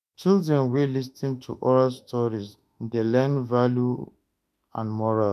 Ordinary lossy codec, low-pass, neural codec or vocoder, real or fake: none; 14.4 kHz; autoencoder, 48 kHz, 32 numbers a frame, DAC-VAE, trained on Japanese speech; fake